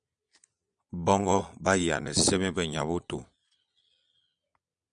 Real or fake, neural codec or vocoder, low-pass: fake; vocoder, 22.05 kHz, 80 mel bands, Vocos; 9.9 kHz